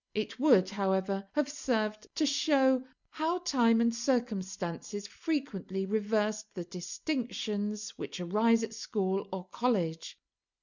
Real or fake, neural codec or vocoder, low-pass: real; none; 7.2 kHz